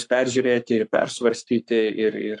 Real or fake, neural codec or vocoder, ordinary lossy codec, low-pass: fake; codec, 44.1 kHz, 7.8 kbps, Pupu-Codec; AAC, 64 kbps; 10.8 kHz